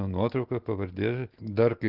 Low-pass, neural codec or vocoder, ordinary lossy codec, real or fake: 5.4 kHz; none; Opus, 16 kbps; real